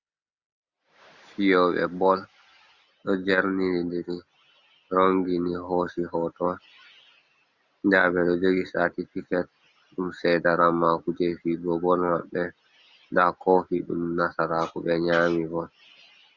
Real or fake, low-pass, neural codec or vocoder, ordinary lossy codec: real; 7.2 kHz; none; Opus, 64 kbps